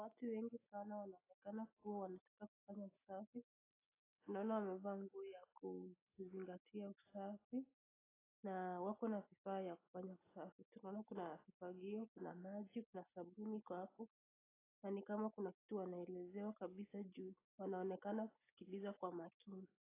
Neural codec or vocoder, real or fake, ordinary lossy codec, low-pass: none; real; AAC, 16 kbps; 3.6 kHz